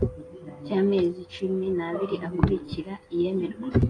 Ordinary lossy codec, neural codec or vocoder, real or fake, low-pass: AAC, 32 kbps; none; real; 9.9 kHz